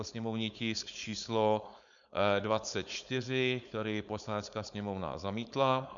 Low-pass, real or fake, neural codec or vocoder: 7.2 kHz; fake; codec, 16 kHz, 4.8 kbps, FACodec